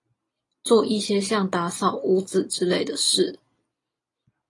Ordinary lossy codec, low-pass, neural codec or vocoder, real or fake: AAC, 32 kbps; 10.8 kHz; none; real